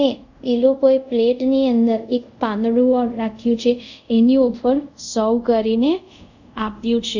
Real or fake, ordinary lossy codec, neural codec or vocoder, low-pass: fake; none; codec, 24 kHz, 0.5 kbps, DualCodec; 7.2 kHz